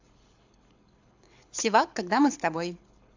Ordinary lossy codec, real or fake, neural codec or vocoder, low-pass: AAC, 48 kbps; fake; codec, 24 kHz, 6 kbps, HILCodec; 7.2 kHz